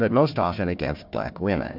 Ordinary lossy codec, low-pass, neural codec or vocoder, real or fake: MP3, 48 kbps; 5.4 kHz; codec, 16 kHz, 1 kbps, FunCodec, trained on Chinese and English, 50 frames a second; fake